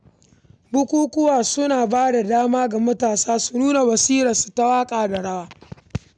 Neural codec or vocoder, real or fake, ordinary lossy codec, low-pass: none; real; none; 9.9 kHz